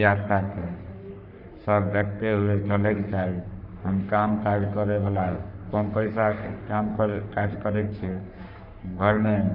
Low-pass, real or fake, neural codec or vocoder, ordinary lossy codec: 5.4 kHz; fake; codec, 44.1 kHz, 3.4 kbps, Pupu-Codec; none